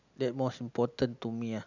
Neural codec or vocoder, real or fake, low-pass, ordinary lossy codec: none; real; 7.2 kHz; none